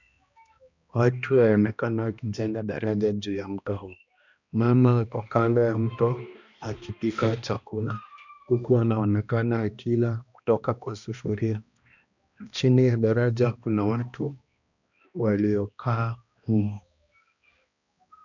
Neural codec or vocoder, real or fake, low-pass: codec, 16 kHz, 1 kbps, X-Codec, HuBERT features, trained on balanced general audio; fake; 7.2 kHz